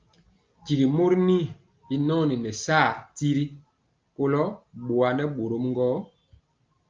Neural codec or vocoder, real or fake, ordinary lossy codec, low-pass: none; real; Opus, 24 kbps; 7.2 kHz